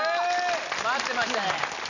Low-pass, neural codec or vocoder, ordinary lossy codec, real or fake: 7.2 kHz; none; none; real